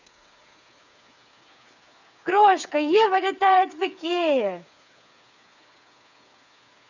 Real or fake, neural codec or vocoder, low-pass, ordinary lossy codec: fake; codec, 16 kHz, 4 kbps, FreqCodec, smaller model; 7.2 kHz; none